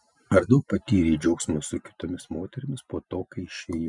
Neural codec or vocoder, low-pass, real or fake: none; 10.8 kHz; real